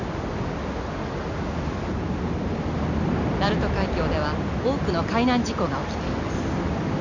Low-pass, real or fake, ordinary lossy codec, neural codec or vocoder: 7.2 kHz; real; none; none